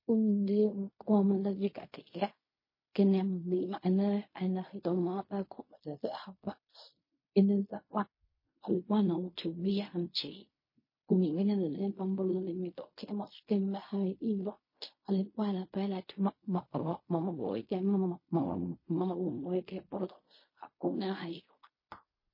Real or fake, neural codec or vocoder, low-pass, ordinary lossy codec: fake; codec, 16 kHz in and 24 kHz out, 0.4 kbps, LongCat-Audio-Codec, fine tuned four codebook decoder; 5.4 kHz; MP3, 24 kbps